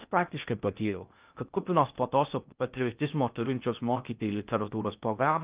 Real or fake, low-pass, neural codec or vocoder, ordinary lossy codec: fake; 3.6 kHz; codec, 16 kHz in and 24 kHz out, 0.6 kbps, FocalCodec, streaming, 2048 codes; Opus, 24 kbps